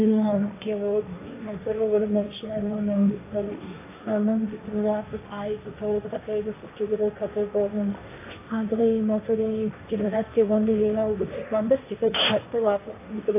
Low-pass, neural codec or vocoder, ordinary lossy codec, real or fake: 3.6 kHz; codec, 16 kHz, 1.1 kbps, Voila-Tokenizer; AAC, 24 kbps; fake